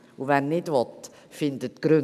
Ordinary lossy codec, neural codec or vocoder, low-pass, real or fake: none; none; 14.4 kHz; real